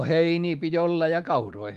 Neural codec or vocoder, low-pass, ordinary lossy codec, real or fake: autoencoder, 48 kHz, 128 numbers a frame, DAC-VAE, trained on Japanese speech; 14.4 kHz; Opus, 24 kbps; fake